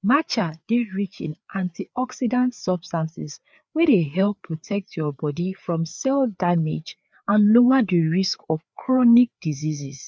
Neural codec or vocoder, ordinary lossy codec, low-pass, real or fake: codec, 16 kHz, 4 kbps, FreqCodec, larger model; none; none; fake